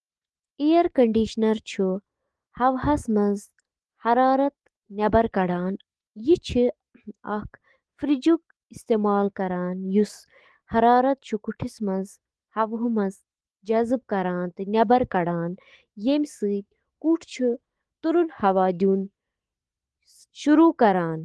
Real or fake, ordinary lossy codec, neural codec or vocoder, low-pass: fake; Opus, 16 kbps; autoencoder, 48 kHz, 128 numbers a frame, DAC-VAE, trained on Japanese speech; 10.8 kHz